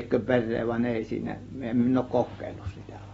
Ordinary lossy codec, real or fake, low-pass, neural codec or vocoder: AAC, 24 kbps; real; 19.8 kHz; none